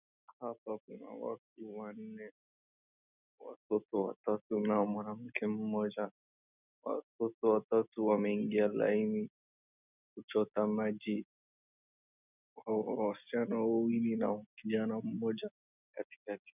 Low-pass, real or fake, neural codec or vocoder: 3.6 kHz; real; none